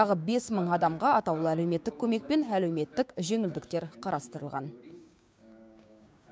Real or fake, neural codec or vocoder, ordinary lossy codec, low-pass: fake; codec, 16 kHz, 6 kbps, DAC; none; none